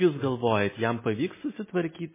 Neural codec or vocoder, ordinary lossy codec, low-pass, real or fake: none; MP3, 16 kbps; 3.6 kHz; real